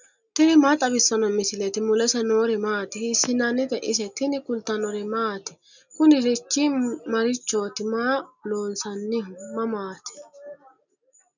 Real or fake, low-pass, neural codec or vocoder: real; 7.2 kHz; none